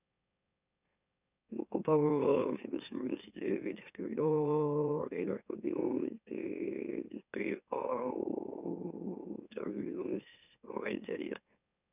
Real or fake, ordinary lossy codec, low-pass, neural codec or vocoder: fake; none; 3.6 kHz; autoencoder, 44.1 kHz, a latent of 192 numbers a frame, MeloTTS